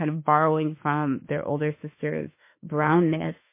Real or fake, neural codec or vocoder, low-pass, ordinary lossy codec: fake; autoencoder, 48 kHz, 32 numbers a frame, DAC-VAE, trained on Japanese speech; 3.6 kHz; MP3, 24 kbps